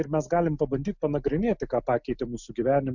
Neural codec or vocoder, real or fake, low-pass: none; real; 7.2 kHz